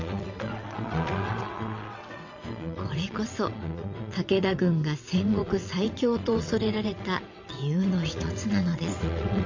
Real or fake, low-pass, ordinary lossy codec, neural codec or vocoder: fake; 7.2 kHz; MP3, 64 kbps; vocoder, 22.05 kHz, 80 mel bands, WaveNeXt